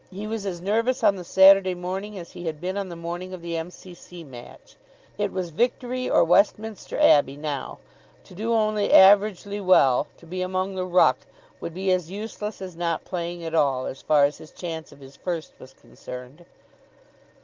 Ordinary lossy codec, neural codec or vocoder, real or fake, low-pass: Opus, 24 kbps; none; real; 7.2 kHz